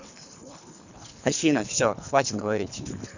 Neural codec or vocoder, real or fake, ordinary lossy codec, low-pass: codec, 24 kHz, 3 kbps, HILCodec; fake; none; 7.2 kHz